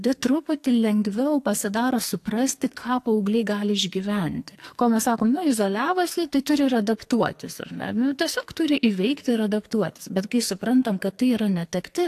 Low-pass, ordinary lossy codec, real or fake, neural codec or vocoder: 14.4 kHz; AAC, 64 kbps; fake; codec, 44.1 kHz, 2.6 kbps, SNAC